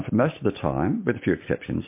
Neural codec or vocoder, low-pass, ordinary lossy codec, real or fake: none; 3.6 kHz; MP3, 24 kbps; real